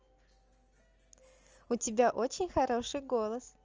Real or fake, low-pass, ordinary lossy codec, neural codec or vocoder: real; 7.2 kHz; Opus, 24 kbps; none